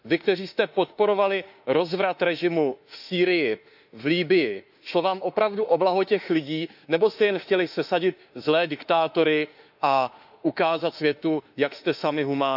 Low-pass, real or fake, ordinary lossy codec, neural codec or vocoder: 5.4 kHz; fake; none; autoencoder, 48 kHz, 32 numbers a frame, DAC-VAE, trained on Japanese speech